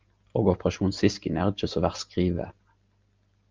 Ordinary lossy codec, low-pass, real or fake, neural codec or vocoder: Opus, 24 kbps; 7.2 kHz; real; none